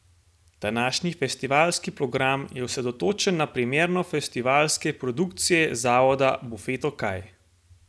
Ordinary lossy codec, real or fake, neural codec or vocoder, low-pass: none; real; none; none